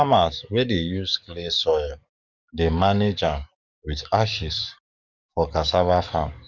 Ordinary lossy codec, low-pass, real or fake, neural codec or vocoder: none; 7.2 kHz; fake; codec, 44.1 kHz, 7.8 kbps, DAC